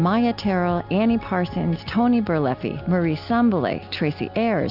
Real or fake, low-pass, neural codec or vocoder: real; 5.4 kHz; none